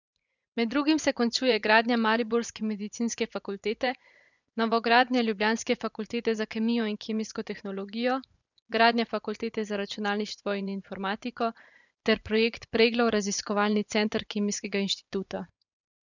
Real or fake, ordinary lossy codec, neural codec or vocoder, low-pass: fake; none; vocoder, 24 kHz, 100 mel bands, Vocos; 7.2 kHz